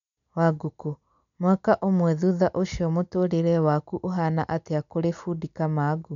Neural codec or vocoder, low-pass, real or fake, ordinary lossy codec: none; 7.2 kHz; real; none